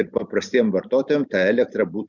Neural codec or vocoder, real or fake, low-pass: none; real; 7.2 kHz